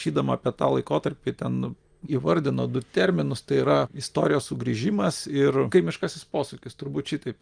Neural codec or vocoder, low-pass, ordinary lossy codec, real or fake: none; 9.9 kHz; Opus, 32 kbps; real